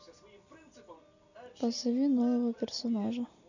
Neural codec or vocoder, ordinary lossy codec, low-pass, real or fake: none; none; 7.2 kHz; real